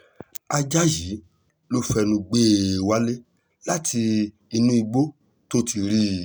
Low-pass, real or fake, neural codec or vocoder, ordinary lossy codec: none; real; none; none